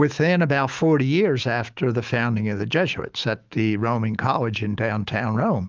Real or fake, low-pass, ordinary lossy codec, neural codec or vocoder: fake; 7.2 kHz; Opus, 24 kbps; autoencoder, 48 kHz, 128 numbers a frame, DAC-VAE, trained on Japanese speech